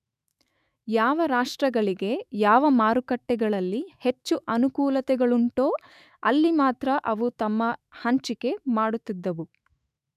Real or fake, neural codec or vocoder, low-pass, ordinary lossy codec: fake; autoencoder, 48 kHz, 128 numbers a frame, DAC-VAE, trained on Japanese speech; 14.4 kHz; none